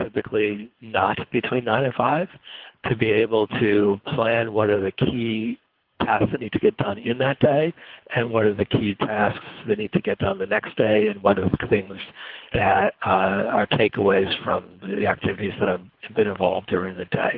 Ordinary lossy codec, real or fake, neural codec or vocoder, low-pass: Opus, 32 kbps; fake; codec, 24 kHz, 3 kbps, HILCodec; 5.4 kHz